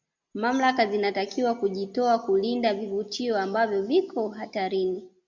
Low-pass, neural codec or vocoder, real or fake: 7.2 kHz; none; real